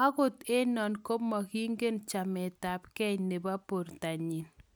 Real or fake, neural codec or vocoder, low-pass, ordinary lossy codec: real; none; none; none